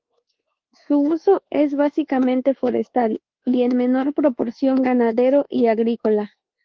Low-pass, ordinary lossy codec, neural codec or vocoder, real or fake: 7.2 kHz; Opus, 16 kbps; codec, 24 kHz, 1.2 kbps, DualCodec; fake